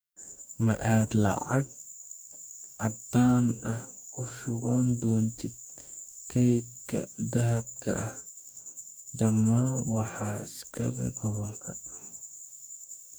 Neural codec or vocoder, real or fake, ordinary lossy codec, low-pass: codec, 44.1 kHz, 2.6 kbps, DAC; fake; none; none